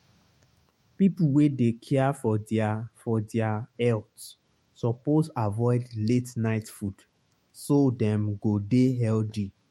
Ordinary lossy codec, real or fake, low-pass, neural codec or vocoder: MP3, 64 kbps; fake; 19.8 kHz; autoencoder, 48 kHz, 128 numbers a frame, DAC-VAE, trained on Japanese speech